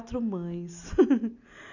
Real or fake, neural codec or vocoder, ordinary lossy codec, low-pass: real; none; none; 7.2 kHz